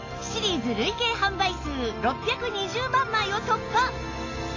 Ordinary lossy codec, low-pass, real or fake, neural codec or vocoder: AAC, 32 kbps; 7.2 kHz; real; none